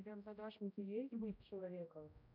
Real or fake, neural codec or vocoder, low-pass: fake; codec, 16 kHz, 0.5 kbps, X-Codec, HuBERT features, trained on general audio; 5.4 kHz